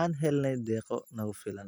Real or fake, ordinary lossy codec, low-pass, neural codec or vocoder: real; none; none; none